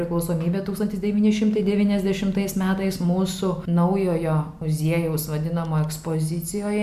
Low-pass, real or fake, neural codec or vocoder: 14.4 kHz; real; none